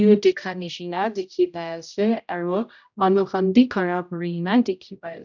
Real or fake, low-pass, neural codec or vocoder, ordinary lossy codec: fake; 7.2 kHz; codec, 16 kHz, 0.5 kbps, X-Codec, HuBERT features, trained on general audio; none